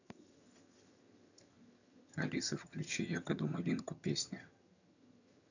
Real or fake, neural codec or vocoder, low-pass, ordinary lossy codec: fake; vocoder, 22.05 kHz, 80 mel bands, HiFi-GAN; 7.2 kHz; none